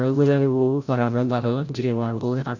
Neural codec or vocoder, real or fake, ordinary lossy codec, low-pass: codec, 16 kHz, 0.5 kbps, FreqCodec, larger model; fake; none; 7.2 kHz